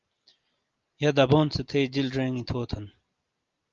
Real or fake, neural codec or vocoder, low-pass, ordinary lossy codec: real; none; 7.2 kHz; Opus, 32 kbps